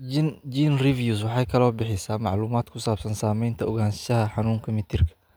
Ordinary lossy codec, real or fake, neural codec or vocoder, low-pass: none; real; none; none